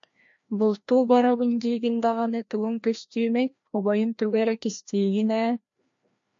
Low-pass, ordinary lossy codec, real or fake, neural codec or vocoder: 7.2 kHz; MP3, 48 kbps; fake; codec, 16 kHz, 1 kbps, FreqCodec, larger model